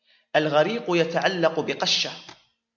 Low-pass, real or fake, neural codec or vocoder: 7.2 kHz; real; none